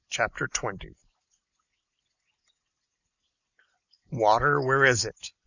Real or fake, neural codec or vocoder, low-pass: real; none; 7.2 kHz